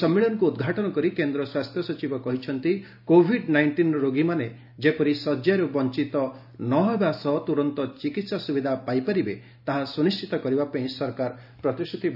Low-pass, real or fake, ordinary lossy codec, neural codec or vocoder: 5.4 kHz; real; none; none